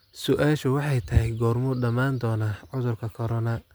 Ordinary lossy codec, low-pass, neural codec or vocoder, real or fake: none; none; none; real